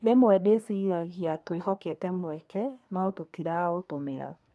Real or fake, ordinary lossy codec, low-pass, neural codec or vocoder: fake; none; none; codec, 24 kHz, 1 kbps, SNAC